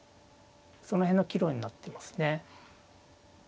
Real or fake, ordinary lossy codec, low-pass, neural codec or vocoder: real; none; none; none